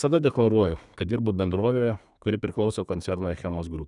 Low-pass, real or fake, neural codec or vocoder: 10.8 kHz; fake; codec, 32 kHz, 1.9 kbps, SNAC